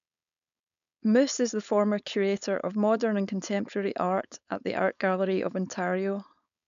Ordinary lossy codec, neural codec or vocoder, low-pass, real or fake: none; codec, 16 kHz, 4.8 kbps, FACodec; 7.2 kHz; fake